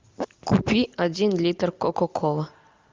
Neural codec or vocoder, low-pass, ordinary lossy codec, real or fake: none; 7.2 kHz; Opus, 24 kbps; real